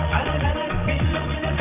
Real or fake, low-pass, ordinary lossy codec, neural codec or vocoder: fake; 3.6 kHz; AAC, 24 kbps; vocoder, 22.05 kHz, 80 mel bands, WaveNeXt